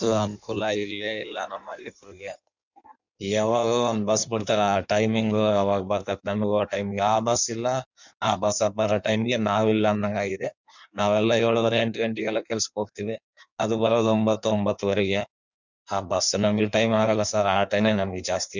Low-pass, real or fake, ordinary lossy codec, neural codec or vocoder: 7.2 kHz; fake; none; codec, 16 kHz in and 24 kHz out, 1.1 kbps, FireRedTTS-2 codec